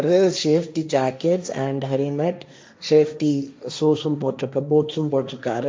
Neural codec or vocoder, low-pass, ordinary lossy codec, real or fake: codec, 16 kHz, 1.1 kbps, Voila-Tokenizer; none; none; fake